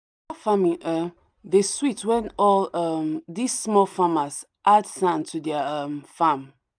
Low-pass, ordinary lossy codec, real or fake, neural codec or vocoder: 9.9 kHz; none; real; none